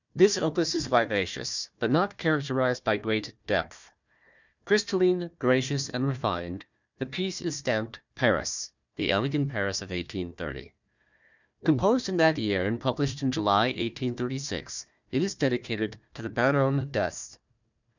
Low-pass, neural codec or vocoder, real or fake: 7.2 kHz; codec, 16 kHz, 1 kbps, FunCodec, trained on Chinese and English, 50 frames a second; fake